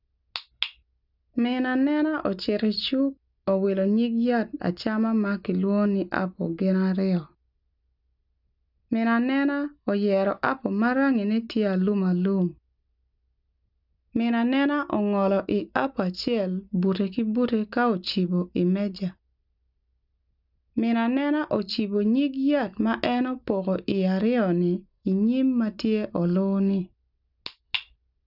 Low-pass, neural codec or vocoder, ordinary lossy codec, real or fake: 5.4 kHz; none; none; real